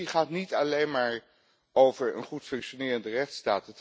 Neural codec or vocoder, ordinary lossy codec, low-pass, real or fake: none; none; none; real